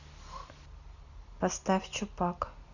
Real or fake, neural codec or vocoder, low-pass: real; none; 7.2 kHz